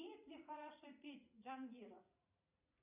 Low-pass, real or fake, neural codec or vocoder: 3.6 kHz; fake; vocoder, 44.1 kHz, 80 mel bands, Vocos